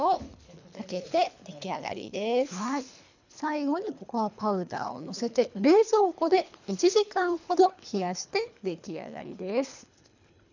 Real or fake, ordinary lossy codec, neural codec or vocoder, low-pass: fake; none; codec, 24 kHz, 3 kbps, HILCodec; 7.2 kHz